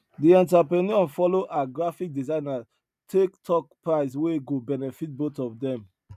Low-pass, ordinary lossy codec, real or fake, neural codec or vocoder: 14.4 kHz; none; real; none